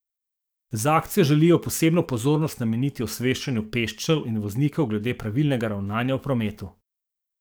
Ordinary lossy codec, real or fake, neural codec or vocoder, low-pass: none; fake; codec, 44.1 kHz, 7.8 kbps, DAC; none